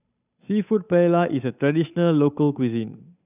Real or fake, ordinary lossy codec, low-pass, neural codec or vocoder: fake; none; 3.6 kHz; codec, 16 kHz, 8 kbps, FunCodec, trained on Chinese and English, 25 frames a second